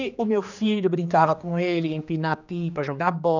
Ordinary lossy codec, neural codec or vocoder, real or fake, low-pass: none; codec, 16 kHz, 2 kbps, X-Codec, HuBERT features, trained on general audio; fake; 7.2 kHz